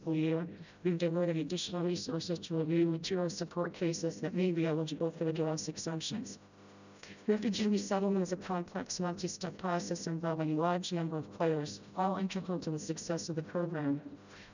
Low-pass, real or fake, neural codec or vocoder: 7.2 kHz; fake; codec, 16 kHz, 0.5 kbps, FreqCodec, smaller model